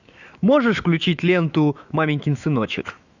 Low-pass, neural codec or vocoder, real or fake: 7.2 kHz; autoencoder, 48 kHz, 128 numbers a frame, DAC-VAE, trained on Japanese speech; fake